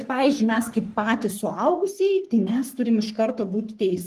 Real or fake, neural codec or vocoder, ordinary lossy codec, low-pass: fake; codec, 44.1 kHz, 3.4 kbps, Pupu-Codec; Opus, 24 kbps; 14.4 kHz